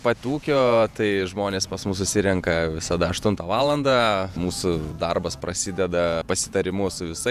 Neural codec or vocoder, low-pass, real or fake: vocoder, 48 kHz, 128 mel bands, Vocos; 14.4 kHz; fake